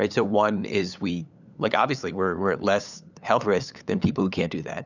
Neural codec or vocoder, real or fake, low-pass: codec, 16 kHz, 8 kbps, FunCodec, trained on LibriTTS, 25 frames a second; fake; 7.2 kHz